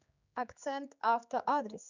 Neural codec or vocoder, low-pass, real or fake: codec, 16 kHz, 4 kbps, X-Codec, HuBERT features, trained on general audio; 7.2 kHz; fake